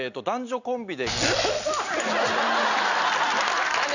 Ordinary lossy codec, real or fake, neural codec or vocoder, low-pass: none; real; none; 7.2 kHz